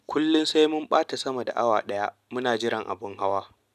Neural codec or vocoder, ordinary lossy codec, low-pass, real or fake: none; none; 14.4 kHz; real